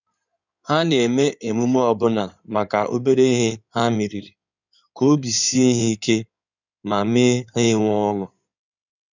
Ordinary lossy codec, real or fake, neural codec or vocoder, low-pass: none; fake; codec, 16 kHz in and 24 kHz out, 2.2 kbps, FireRedTTS-2 codec; 7.2 kHz